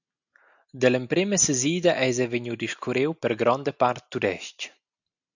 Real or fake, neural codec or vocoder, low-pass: real; none; 7.2 kHz